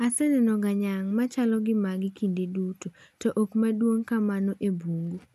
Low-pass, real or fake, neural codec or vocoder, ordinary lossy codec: 14.4 kHz; real; none; none